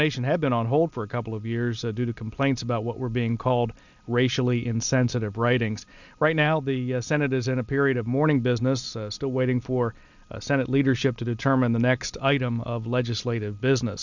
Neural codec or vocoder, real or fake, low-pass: none; real; 7.2 kHz